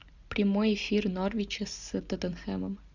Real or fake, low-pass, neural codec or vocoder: real; 7.2 kHz; none